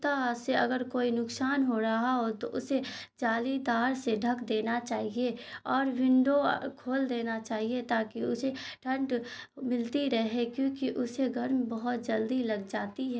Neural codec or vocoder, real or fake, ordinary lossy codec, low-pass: none; real; none; none